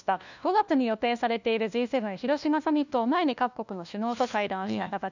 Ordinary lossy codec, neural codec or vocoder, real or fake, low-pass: none; codec, 16 kHz, 1 kbps, FunCodec, trained on LibriTTS, 50 frames a second; fake; 7.2 kHz